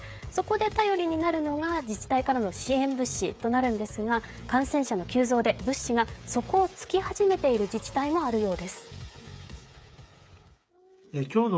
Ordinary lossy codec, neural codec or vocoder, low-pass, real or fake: none; codec, 16 kHz, 8 kbps, FreqCodec, smaller model; none; fake